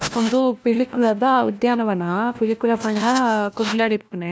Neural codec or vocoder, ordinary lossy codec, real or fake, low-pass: codec, 16 kHz, 1 kbps, FunCodec, trained on LibriTTS, 50 frames a second; none; fake; none